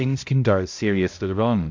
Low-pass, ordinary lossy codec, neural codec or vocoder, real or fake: 7.2 kHz; MP3, 64 kbps; codec, 16 kHz, 0.5 kbps, X-Codec, HuBERT features, trained on balanced general audio; fake